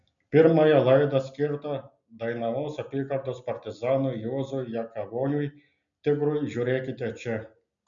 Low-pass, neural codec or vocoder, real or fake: 7.2 kHz; none; real